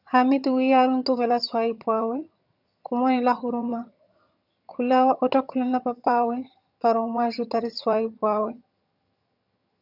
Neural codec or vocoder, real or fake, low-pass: vocoder, 22.05 kHz, 80 mel bands, HiFi-GAN; fake; 5.4 kHz